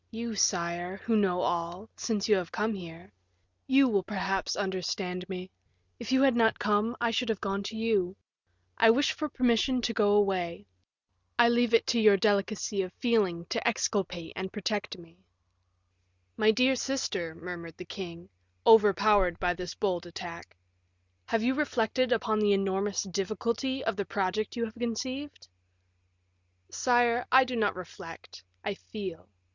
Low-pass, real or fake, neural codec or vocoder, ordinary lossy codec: 7.2 kHz; real; none; Opus, 64 kbps